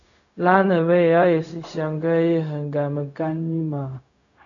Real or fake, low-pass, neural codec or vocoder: fake; 7.2 kHz; codec, 16 kHz, 0.4 kbps, LongCat-Audio-Codec